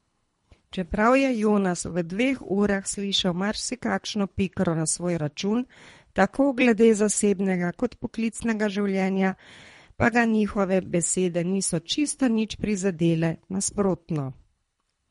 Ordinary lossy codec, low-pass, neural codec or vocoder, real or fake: MP3, 48 kbps; 10.8 kHz; codec, 24 kHz, 3 kbps, HILCodec; fake